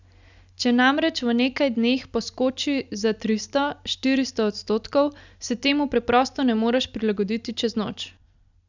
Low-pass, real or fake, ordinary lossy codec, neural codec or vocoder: 7.2 kHz; real; none; none